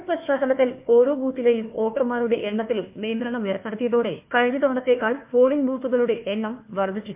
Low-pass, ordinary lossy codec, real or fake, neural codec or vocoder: 3.6 kHz; MP3, 32 kbps; fake; codec, 16 kHz, 1 kbps, FunCodec, trained on Chinese and English, 50 frames a second